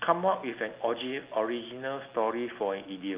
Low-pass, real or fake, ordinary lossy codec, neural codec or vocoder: 3.6 kHz; real; Opus, 24 kbps; none